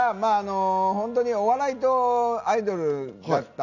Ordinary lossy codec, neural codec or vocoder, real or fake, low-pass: none; none; real; 7.2 kHz